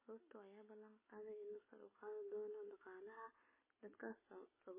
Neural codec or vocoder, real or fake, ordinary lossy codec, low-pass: none; real; MP3, 16 kbps; 3.6 kHz